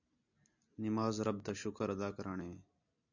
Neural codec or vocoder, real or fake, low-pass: none; real; 7.2 kHz